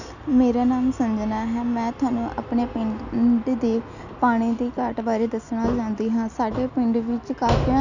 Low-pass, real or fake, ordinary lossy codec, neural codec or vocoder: 7.2 kHz; real; none; none